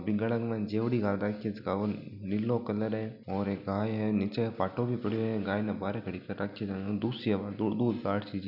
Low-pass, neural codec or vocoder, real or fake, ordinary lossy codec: 5.4 kHz; none; real; none